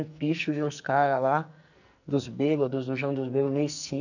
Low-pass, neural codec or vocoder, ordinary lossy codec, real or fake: 7.2 kHz; codec, 44.1 kHz, 2.6 kbps, SNAC; none; fake